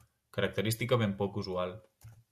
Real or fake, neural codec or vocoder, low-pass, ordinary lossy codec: real; none; 14.4 kHz; Opus, 64 kbps